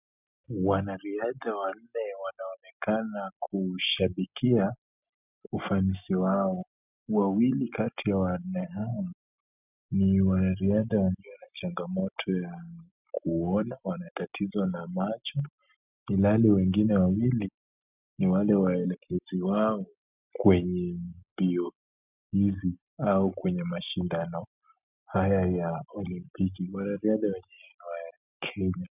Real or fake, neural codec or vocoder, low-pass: real; none; 3.6 kHz